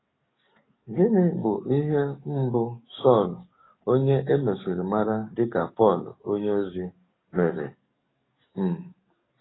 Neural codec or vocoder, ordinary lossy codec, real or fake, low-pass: codec, 44.1 kHz, 7.8 kbps, DAC; AAC, 16 kbps; fake; 7.2 kHz